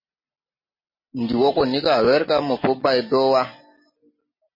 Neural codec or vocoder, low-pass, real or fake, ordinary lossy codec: none; 5.4 kHz; real; MP3, 24 kbps